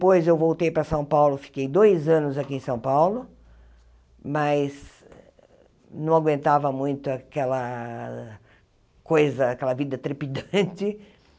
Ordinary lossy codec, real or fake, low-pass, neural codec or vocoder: none; real; none; none